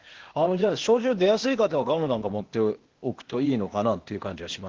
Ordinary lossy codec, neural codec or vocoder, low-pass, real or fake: Opus, 16 kbps; codec, 16 kHz, 0.8 kbps, ZipCodec; 7.2 kHz; fake